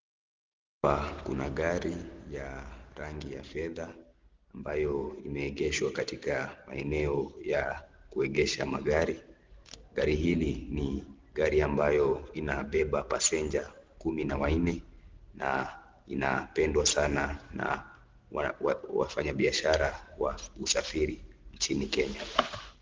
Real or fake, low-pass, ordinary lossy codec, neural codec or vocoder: real; 7.2 kHz; Opus, 16 kbps; none